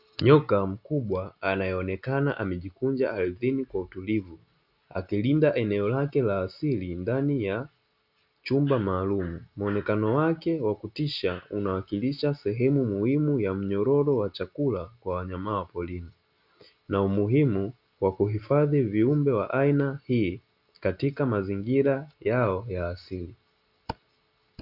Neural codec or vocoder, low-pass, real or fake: none; 5.4 kHz; real